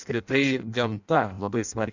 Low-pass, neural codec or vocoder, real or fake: 7.2 kHz; codec, 16 kHz in and 24 kHz out, 0.6 kbps, FireRedTTS-2 codec; fake